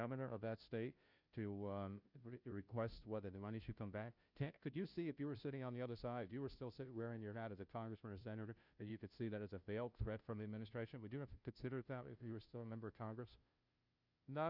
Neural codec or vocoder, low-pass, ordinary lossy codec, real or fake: codec, 16 kHz, 0.5 kbps, FunCodec, trained on Chinese and English, 25 frames a second; 5.4 kHz; Opus, 64 kbps; fake